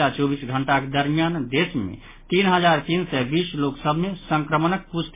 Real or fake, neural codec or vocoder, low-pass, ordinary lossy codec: real; none; 3.6 kHz; MP3, 16 kbps